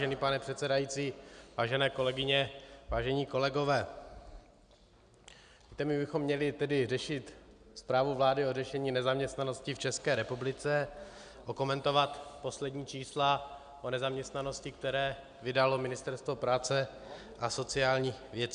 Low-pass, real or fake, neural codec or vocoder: 9.9 kHz; real; none